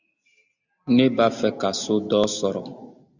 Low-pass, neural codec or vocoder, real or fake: 7.2 kHz; none; real